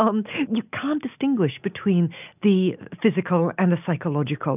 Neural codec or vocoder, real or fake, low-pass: none; real; 3.6 kHz